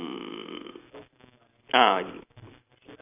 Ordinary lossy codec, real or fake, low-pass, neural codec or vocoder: none; real; 3.6 kHz; none